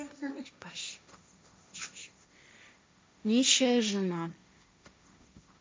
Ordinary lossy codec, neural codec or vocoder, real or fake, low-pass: none; codec, 16 kHz, 1.1 kbps, Voila-Tokenizer; fake; none